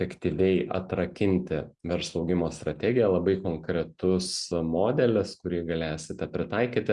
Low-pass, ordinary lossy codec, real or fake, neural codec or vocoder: 10.8 kHz; Opus, 64 kbps; real; none